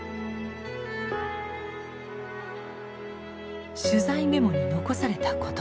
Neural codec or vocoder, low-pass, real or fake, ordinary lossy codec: none; none; real; none